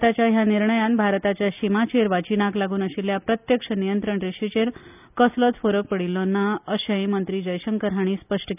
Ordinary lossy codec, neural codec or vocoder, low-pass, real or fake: none; none; 3.6 kHz; real